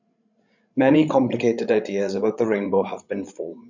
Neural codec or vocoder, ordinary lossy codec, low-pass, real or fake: codec, 16 kHz, 16 kbps, FreqCodec, larger model; none; 7.2 kHz; fake